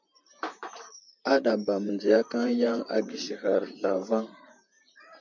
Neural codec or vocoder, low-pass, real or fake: vocoder, 44.1 kHz, 128 mel bands, Pupu-Vocoder; 7.2 kHz; fake